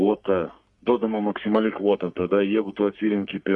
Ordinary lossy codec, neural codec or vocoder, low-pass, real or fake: Opus, 64 kbps; codec, 44.1 kHz, 3.4 kbps, Pupu-Codec; 10.8 kHz; fake